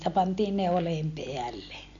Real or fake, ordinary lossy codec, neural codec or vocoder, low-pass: real; none; none; 7.2 kHz